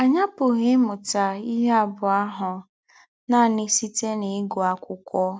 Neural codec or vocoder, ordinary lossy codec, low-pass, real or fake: none; none; none; real